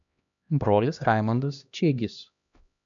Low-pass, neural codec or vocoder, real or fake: 7.2 kHz; codec, 16 kHz, 1 kbps, X-Codec, HuBERT features, trained on LibriSpeech; fake